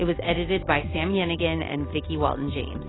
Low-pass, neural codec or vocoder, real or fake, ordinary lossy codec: 7.2 kHz; none; real; AAC, 16 kbps